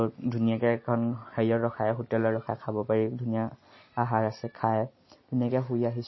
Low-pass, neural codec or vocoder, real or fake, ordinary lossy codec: 7.2 kHz; none; real; MP3, 24 kbps